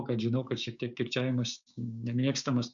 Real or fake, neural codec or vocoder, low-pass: fake; codec, 16 kHz, 6 kbps, DAC; 7.2 kHz